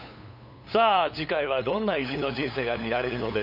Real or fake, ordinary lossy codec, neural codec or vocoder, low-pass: fake; none; codec, 16 kHz, 8 kbps, FunCodec, trained on LibriTTS, 25 frames a second; 5.4 kHz